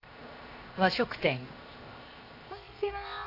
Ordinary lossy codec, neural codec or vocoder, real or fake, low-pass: AAC, 32 kbps; codec, 16 kHz, 0.7 kbps, FocalCodec; fake; 5.4 kHz